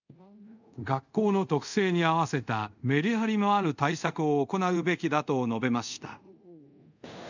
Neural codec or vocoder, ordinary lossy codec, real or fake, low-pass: codec, 24 kHz, 0.5 kbps, DualCodec; none; fake; 7.2 kHz